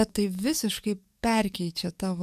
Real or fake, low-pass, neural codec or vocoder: fake; 14.4 kHz; codec, 44.1 kHz, 7.8 kbps, DAC